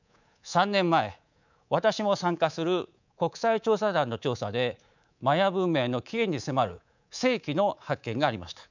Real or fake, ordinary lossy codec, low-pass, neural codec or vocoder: fake; none; 7.2 kHz; codec, 24 kHz, 3.1 kbps, DualCodec